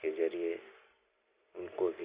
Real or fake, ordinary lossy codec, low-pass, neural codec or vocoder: real; Opus, 64 kbps; 3.6 kHz; none